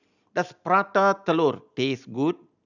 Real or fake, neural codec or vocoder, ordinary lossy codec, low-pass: real; none; none; 7.2 kHz